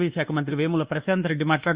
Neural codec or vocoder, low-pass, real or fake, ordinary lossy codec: codec, 24 kHz, 1.2 kbps, DualCodec; 3.6 kHz; fake; Opus, 64 kbps